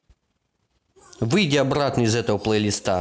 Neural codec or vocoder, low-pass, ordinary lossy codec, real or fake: none; none; none; real